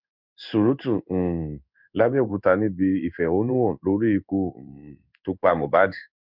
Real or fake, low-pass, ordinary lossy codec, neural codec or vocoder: fake; 5.4 kHz; none; codec, 16 kHz in and 24 kHz out, 1 kbps, XY-Tokenizer